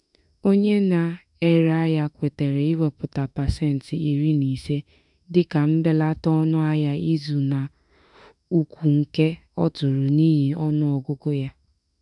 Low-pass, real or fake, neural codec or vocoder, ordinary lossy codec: 10.8 kHz; fake; autoencoder, 48 kHz, 32 numbers a frame, DAC-VAE, trained on Japanese speech; none